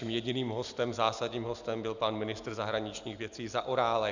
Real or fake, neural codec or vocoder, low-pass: real; none; 7.2 kHz